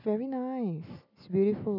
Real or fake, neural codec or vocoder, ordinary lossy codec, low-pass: real; none; none; 5.4 kHz